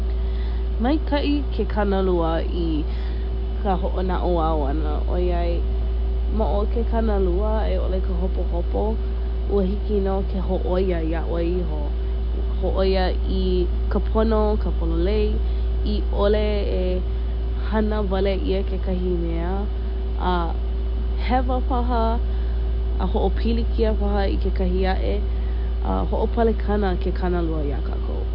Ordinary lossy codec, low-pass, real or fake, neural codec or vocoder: none; 5.4 kHz; real; none